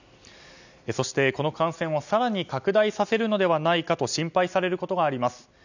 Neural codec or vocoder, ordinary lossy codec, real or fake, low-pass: none; none; real; 7.2 kHz